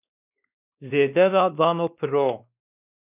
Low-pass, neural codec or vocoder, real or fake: 3.6 kHz; codec, 16 kHz, 2 kbps, X-Codec, WavLM features, trained on Multilingual LibriSpeech; fake